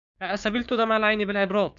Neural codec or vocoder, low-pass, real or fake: codec, 16 kHz, 6 kbps, DAC; 7.2 kHz; fake